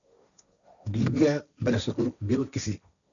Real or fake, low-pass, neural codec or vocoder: fake; 7.2 kHz; codec, 16 kHz, 1.1 kbps, Voila-Tokenizer